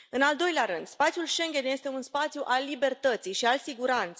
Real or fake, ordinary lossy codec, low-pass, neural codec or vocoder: real; none; none; none